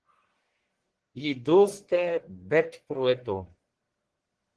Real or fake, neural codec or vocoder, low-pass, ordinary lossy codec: fake; codec, 44.1 kHz, 1.7 kbps, Pupu-Codec; 10.8 kHz; Opus, 16 kbps